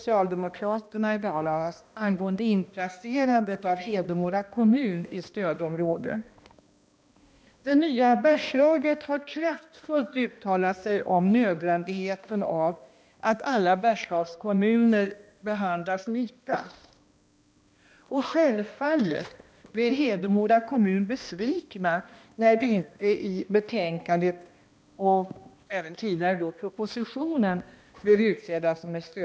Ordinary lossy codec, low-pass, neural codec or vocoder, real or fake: none; none; codec, 16 kHz, 1 kbps, X-Codec, HuBERT features, trained on balanced general audio; fake